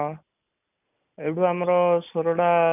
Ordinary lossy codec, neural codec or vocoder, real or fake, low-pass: none; none; real; 3.6 kHz